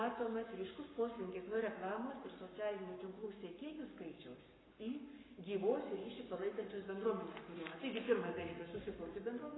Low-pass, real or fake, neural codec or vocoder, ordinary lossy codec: 7.2 kHz; fake; codec, 44.1 kHz, 7.8 kbps, Pupu-Codec; AAC, 16 kbps